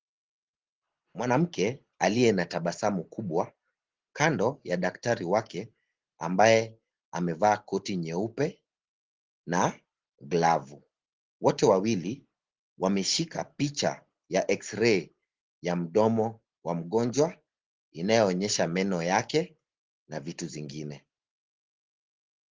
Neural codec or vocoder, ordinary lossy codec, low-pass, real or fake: none; Opus, 16 kbps; 7.2 kHz; real